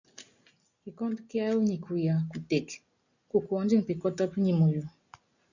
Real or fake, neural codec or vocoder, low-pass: real; none; 7.2 kHz